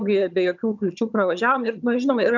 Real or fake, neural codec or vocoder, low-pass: fake; vocoder, 22.05 kHz, 80 mel bands, HiFi-GAN; 7.2 kHz